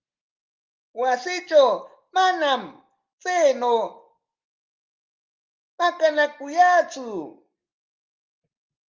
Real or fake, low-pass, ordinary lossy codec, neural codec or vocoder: real; 7.2 kHz; Opus, 32 kbps; none